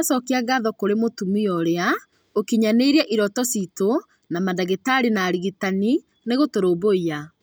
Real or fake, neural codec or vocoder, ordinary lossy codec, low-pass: real; none; none; none